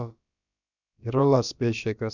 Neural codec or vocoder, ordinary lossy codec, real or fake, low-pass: codec, 16 kHz, about 1 kbps, DyCAST, with the encoder's durations; none; fake; 7.2 kHz